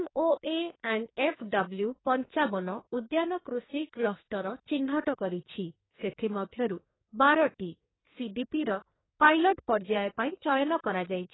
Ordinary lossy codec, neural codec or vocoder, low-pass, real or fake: AAC, 16 kbps; codec, 44.1 kHz, 2.6 kbps, SNAC; 7.2 kHz; fake